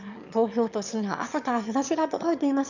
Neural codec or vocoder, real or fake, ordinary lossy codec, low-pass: autoencoder, 22.05 kHz, a latent of 192 numbers a frame, VITS, trained on one speaker; fake; none; 7.2 kHz